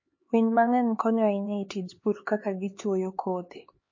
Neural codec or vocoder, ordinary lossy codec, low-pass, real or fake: codec, 16 kHz, 4 kbps, X-Codec, HuBERT features, trained on LibriSpeech; MP3, 32 kbps; 7.2 kHz; fake